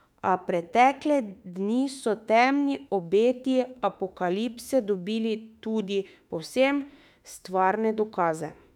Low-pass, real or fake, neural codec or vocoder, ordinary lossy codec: 19.8 kHz; fake; autoencoder, 48 kHz, 32 numbers a frame, DAC-VAE, trained on Japanese speech; none